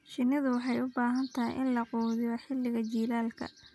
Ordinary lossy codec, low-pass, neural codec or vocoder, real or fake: none; none; none; real